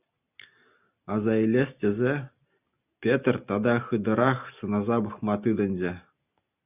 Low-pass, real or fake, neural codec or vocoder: 3.6 kHz; real; none